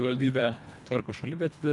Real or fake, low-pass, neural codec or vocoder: fake; 10.8 kHz; codec, 24 kHz, 1.5 kbps, HILCodec